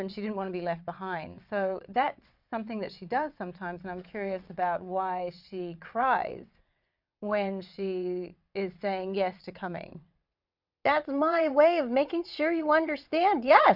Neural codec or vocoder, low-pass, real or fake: vocoder, 22.05 kHz, 80 mel bands, WaveNeXt; 5.4 kHz; fake